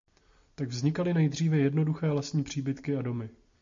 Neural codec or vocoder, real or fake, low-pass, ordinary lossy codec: none; real; 7.2 kHz; MP3, 48 kbps